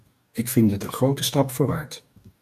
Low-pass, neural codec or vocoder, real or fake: 14.4 kHz; codec, 44.1 kHz, 2.6 kbps, DAC; fake